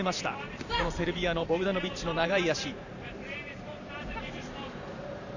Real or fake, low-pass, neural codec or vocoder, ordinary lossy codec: fake; 7.2 kHz; vocoder, 44.1 kHz, 128 mel bands every 512 samples, BigVGAN v2; none